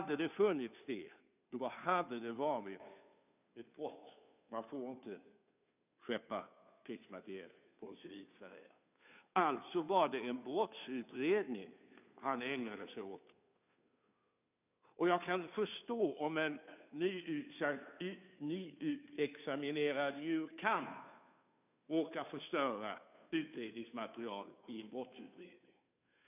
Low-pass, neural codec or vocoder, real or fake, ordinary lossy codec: 3.6 kHz; codec, 16 kHz, 2 kbps, FunCodec, trained on Chinese and English, 25 frames a second; fake; none